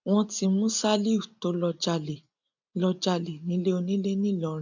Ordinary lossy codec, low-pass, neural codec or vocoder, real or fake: none; 7.2 kHz; none; real